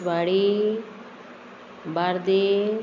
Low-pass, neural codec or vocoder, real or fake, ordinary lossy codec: 7.2 kHz; none; real; none